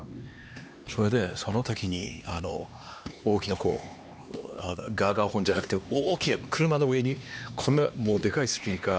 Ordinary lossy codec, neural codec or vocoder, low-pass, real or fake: none; codec, 16 kHz, 2 kbps, X-Codec, HuBERT features, trained on LibriSpeech; none; fake